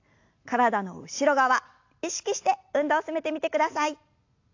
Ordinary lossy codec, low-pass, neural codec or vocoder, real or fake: AAC, 48 kbps; 7.2 kHz; none; real